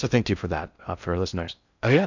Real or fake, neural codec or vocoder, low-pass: fake; codec, 16 kHz in and 24 kHz out, 0.6 kbps, FocalCodec, streaming, 2048 codes; 7.2 kHz